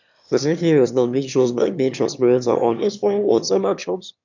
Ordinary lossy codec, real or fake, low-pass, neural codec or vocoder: none; fake; 7.2 kHz; autoencoder, 22.05 kHz, a latent of 192 numbers a frame, VITS, trained on one speaker